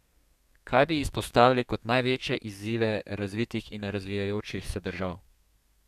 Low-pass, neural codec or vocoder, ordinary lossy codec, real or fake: 14.4 kHz; codec, 32 kHz, 1.9 kbps, SNAC; none; fake